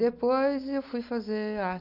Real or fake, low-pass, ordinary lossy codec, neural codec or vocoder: real; 5.4 kHz; none; none